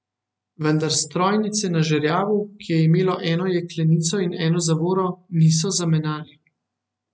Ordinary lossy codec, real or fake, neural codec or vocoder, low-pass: none; real; none; none